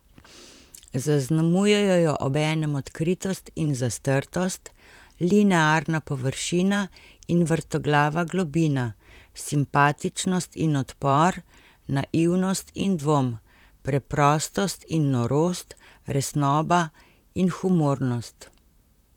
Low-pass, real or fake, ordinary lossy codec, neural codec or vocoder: 19.8 kHz; fake; none; vocoder, 44.1 kHz, 128 mel bands, Pupu-Vocoder